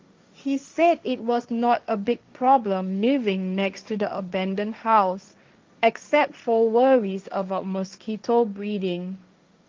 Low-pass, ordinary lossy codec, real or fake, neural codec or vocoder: 7.2 kHz; Opus, 32 kbps; fake; codec, 16 kHz, 1.1 kbps, Voila-Tokenizer